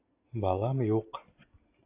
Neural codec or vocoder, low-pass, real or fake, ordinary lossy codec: none; 3.6 kHz; real; AAC, 32 kbps